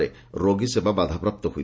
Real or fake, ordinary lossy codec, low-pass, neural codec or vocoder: real; none; none; none